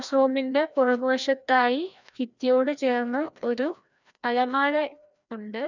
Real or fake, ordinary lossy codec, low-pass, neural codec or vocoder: fake; none; 7.2 kHz; codec, 16 kHz, 1 kbps, FreqCodec, larger model